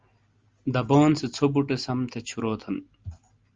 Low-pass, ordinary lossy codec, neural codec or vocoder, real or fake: 7.2 kHz; Opus, 32 kbps; none; real